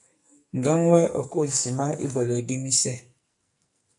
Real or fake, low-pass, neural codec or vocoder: fake; 10.8 kHz; codec, 44.1 kHz, 2.6 kbps, SNAC